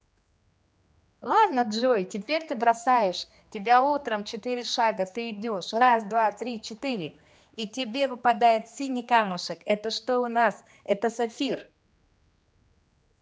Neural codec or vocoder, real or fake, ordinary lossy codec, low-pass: codec, 16 kHz, 2 kbps, X-Codec, HuBERT features, trained on general audio; fake; none; none